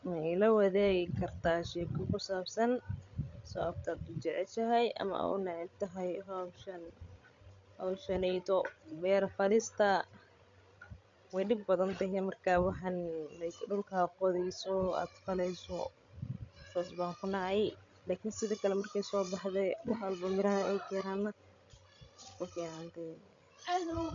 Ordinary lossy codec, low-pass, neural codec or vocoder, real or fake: MP3, 64 kbps; 7.2 kHz; codec, 16 kHz, 16 kbps, FreqCodec, larger model; fake